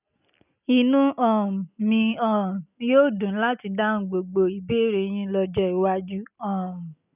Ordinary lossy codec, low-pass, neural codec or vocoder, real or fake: none; 3.6 kHz; none; real